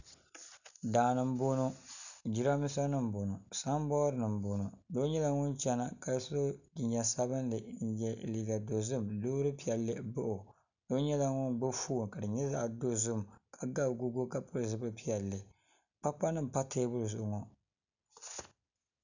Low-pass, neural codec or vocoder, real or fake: 7.2 kHz; none; real